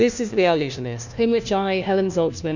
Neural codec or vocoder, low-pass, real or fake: codec, 16 kHz, 1 kbps, FunCodec, trained on LibriTTS, 50 frames a second; 7.2 kHz; fake